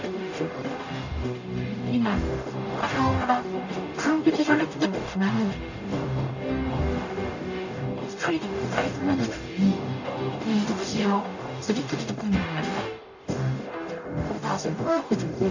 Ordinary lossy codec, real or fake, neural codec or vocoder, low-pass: none; fake; codec, 44.1 kHz, 0.9 kbps, DAC; 7.2 kHz